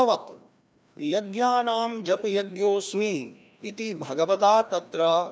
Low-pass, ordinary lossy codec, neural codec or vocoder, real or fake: none; none; codec, 16 kHz, 1 kbps, FreqCodec, larger model; fake